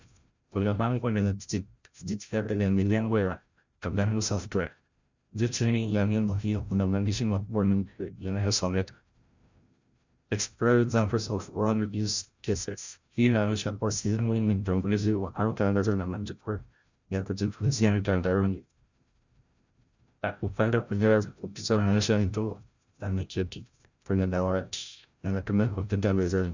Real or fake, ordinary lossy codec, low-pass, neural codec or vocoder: fake; Opus, 64 kbps; 7.2 kHz; codec, 16 kHz, 0.5 kbps, FreqCodec, larger model